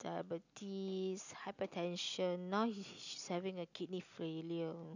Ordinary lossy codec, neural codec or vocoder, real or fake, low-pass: none; none; real; 7.2 kHz